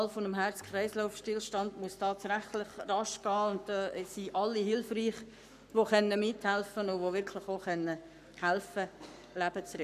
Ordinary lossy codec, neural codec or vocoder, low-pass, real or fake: none; codec, 44.1 kHz, 7.8 kbps, Pupu-Codec; 14.4 kHz; fake